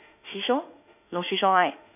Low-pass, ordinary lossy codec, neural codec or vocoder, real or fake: 3.6 kHz; none; autoencoder, 48 kHz, 32 numbers a frame, DAC-VAE, trained on Japanese speech; fake